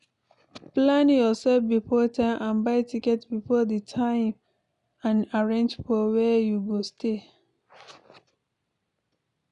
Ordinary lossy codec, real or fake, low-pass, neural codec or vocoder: none; real; 10.8 kHz; none